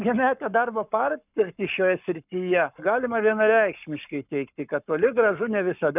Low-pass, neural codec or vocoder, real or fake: 3.6 kHz; codec, 44.1 kHz, 7.8 kbps, Pupu-Codec; fake